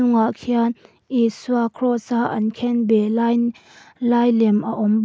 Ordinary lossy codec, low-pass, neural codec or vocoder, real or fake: none; none; none; real